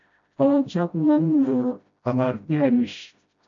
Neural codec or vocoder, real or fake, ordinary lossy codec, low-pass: codec, 16 kHz, 0.5 kbps, FreqCodec, smaller model; fake; MP3, 64 kbps; 7.2 kHz